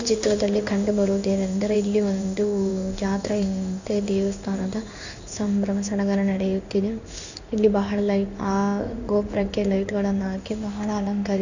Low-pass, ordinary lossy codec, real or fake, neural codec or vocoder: 7.2 kHz; none; fake; codec, 16 kHz in and 24 kHz out, 1 kbps, XY-Tokenizer